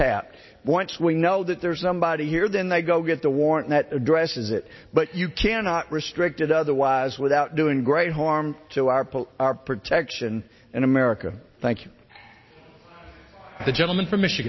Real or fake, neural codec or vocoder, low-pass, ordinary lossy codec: real; none; 7.2 kHz; MP3, 24 kbps